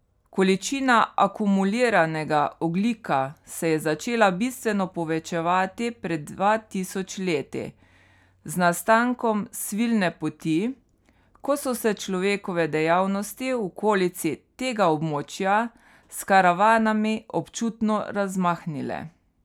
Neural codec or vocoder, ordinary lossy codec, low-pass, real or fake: none; none; 19.8 kHz; real